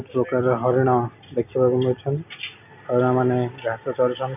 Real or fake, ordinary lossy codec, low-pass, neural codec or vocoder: real; none; 3.6 kHz; none